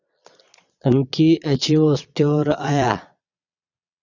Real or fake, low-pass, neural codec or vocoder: fake; 7.2 kHz; vocoder, 22.05 kHz, 80 mel bands, Vocos